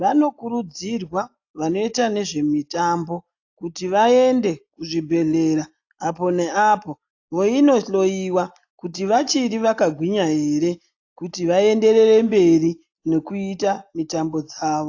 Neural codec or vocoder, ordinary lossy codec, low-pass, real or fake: none; AAC, 48 kbps; 7.2 kHz; real